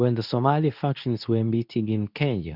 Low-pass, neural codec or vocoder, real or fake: 5.4 kHz; codec, 24 kHz, 0.9 kbps, WavTokenizer, medium speech release version 2; fake